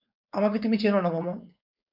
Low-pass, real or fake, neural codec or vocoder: 5.4 kHz; fake; codec, 16 kHz, 4.8 kbps, FACodec